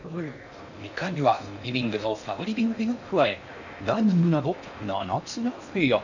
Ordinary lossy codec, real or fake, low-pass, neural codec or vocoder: none; fake; 7.2 kHz; codec, 16 kHz in and 24 kHz out, 0.6 kbps, FocalCodec, streaming, 2048 codes